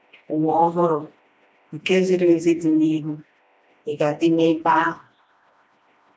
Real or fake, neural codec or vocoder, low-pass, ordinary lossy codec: fake; codec, 16 kHz, 1 kbps, FreqCodec, smaller model; none; none